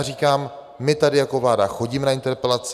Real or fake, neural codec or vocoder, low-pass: real; none; 14.4 kHz